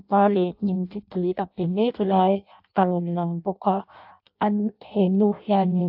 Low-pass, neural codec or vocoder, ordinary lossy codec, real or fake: 5.4 kHz; codec, 16 kHz in and 24 kHz out, 0.6 kbps, FireRedTTS-2 codec; AAC, 48 kbps; fake